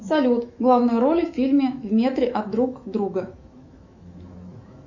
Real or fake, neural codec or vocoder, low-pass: fake; autoencoder, 48 kHz, 128 numbers a frame, DAC-VAE, trained on Japanese speech; 7.2 kHz